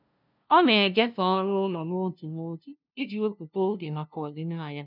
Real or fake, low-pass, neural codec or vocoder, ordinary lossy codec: fake; 5.4 kHz; codec, 16 kHz, 0.5 kbps, FunCodec, trained on LibriTTS, 25 frames a second; none